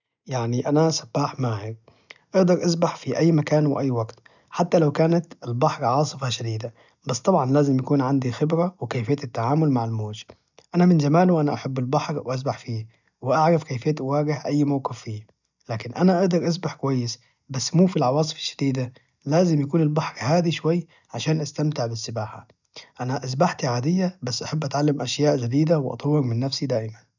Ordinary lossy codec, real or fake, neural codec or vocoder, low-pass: none; real; none; 7.2 kHz